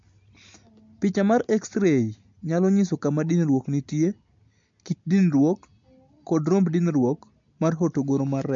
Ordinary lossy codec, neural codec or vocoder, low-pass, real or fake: MP3, 48 kbps; none; 7.2 kHz; real